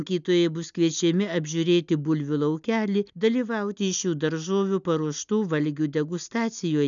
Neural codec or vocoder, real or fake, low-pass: none; real; 7.2 kHz